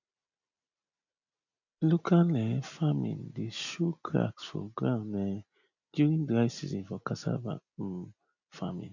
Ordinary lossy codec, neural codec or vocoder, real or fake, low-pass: none; none; real; 7.2 kHz